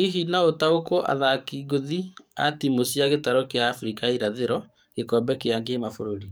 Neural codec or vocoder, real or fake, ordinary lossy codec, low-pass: codec, 44.1 kHz, 7.8 kbps, DAC; fake; none; none